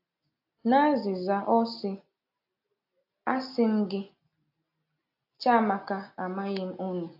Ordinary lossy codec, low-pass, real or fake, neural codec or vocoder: AAC, 48 kbps; 5.4 kHz; real; none